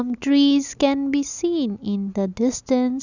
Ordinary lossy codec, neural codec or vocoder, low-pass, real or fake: none; none; 7.2 kHz; real